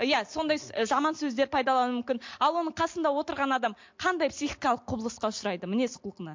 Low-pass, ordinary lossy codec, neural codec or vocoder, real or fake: 7.2 kHz; MP3, 48 kbps; none; real